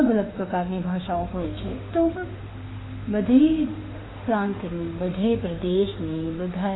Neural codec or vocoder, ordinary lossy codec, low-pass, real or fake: autoencoder, 48 kHz, 32 numbers a frame, DAC-VAE, trained on Japanese speech; AAC, 16 kbps; 7.2 kHz; fake